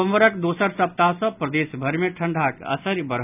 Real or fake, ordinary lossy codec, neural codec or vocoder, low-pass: real; none; none; 3.6 kHz